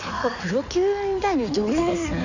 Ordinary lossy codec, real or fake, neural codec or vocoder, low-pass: none; fake; codec, 16 kHz in and 24 kHz out, 2.2 kbps, FireRedTTS-2 codec; 7.2 kHz